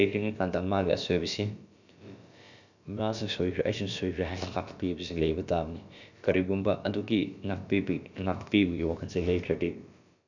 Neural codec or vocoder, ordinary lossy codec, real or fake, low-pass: codec, 16 kHz, about 1 kbps, DyCAST, with the encoder's durations; none; fake; 7.2 kHz